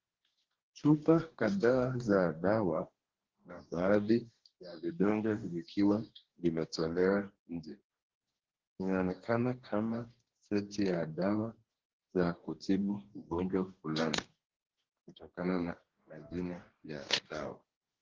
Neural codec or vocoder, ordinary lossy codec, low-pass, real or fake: codec, 44.1 kHz, 2.6 kbps, DAC; Opus, 16 kbps; 7.2 kHz; fake